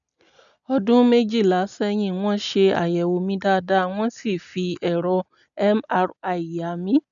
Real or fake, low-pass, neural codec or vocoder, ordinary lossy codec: real; 7.2 kHz; none; none